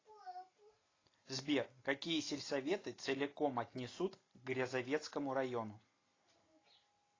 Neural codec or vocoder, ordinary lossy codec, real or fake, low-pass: none; AAC, 32 kbps; real; 7.2 kHz